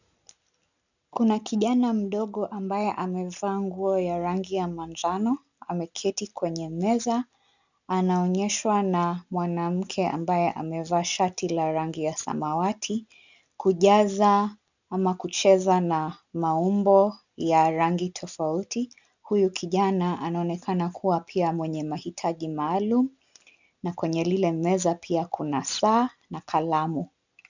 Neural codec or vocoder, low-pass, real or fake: none; 7.2 kHz; real